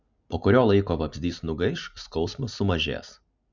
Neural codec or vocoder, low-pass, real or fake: none; 7.2 kHz; real